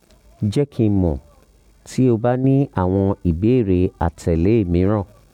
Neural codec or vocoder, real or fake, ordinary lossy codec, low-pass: none; real; none; 19.8 kHz